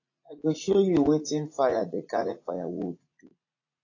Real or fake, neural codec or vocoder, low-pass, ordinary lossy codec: fake; vocoder, 44.1 kHz, 80 mel bands, Vocos; 7.2 kHz; AAC, 32 kbps